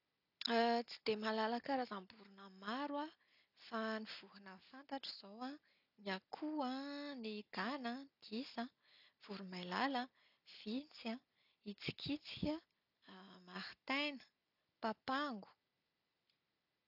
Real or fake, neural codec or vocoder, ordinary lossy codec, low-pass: real; none; none; 5.4 kHz